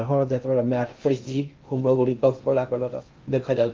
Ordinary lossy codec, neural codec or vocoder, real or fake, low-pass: Opus, 24 kbps; codec, 16 kHz in and 24 kHz out, 0.6 kbps, FocalCodec, streaming, 2048 codes; fake; 7.2 kHz